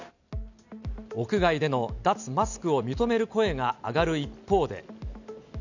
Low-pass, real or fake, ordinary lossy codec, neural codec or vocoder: 7.2 kHz; real; none; none